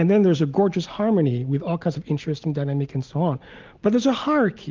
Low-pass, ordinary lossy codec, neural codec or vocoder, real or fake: 7.2 kHz; Opus, 32 kbps; none; real